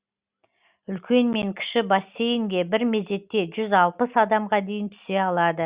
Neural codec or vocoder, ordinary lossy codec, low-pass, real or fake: none; Opus, 64 kbps; 3.6 kHz; real